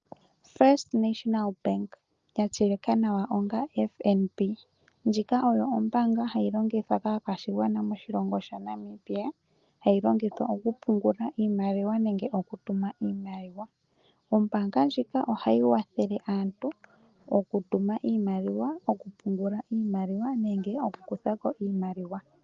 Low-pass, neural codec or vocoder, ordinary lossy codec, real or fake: 7.2 kHz; none; Opus, 32 kbps; real